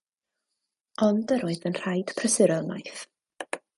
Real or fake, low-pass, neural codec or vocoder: real; 10.8 kHz; none